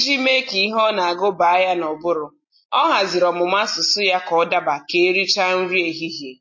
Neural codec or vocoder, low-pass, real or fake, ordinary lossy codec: none; 7.2 kHz; real; MP3, 32 kbps